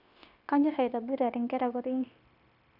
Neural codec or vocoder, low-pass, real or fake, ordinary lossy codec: codec, 16 kHz, 0.9 kbps, LongCat-Audio-Codec; 5.4 kHz; fake; none